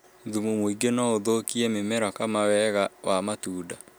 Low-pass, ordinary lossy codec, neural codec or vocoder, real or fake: none; none; none; real